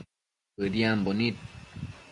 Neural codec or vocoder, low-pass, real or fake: none; 10.8 kHz; real